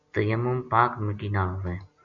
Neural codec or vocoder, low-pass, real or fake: none; 7.2 kHz; real